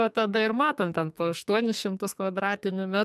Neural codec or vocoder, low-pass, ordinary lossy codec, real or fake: codec, 44.1 kHz, 2.6 kbps, SNAC; 14.4 kHz; MP3, 96 kbps; fake